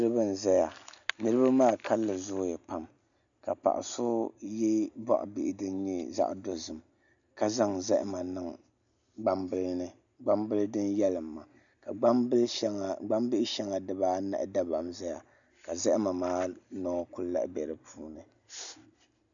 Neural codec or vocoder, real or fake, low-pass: none; real; 7.2 kHz